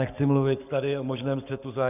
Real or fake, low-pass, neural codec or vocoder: fake; 3.6 kHz; codec, 44.1 kHz, 7.8 kbps, Pupu-Codec